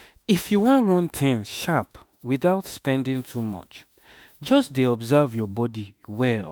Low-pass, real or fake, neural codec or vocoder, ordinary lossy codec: none; fake; autoencoder, 48 kHz, 32 numbers a frame, DAC-VAE, trained on Japanese speech; none